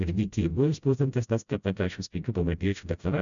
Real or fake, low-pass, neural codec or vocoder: fake; 7.2 kHz; codec, 16 kHz, 0.5 kbps, FreqCodec, smaller model